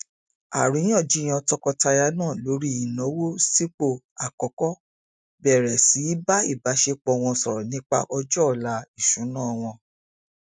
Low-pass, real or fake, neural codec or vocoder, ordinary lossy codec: none; real; none; none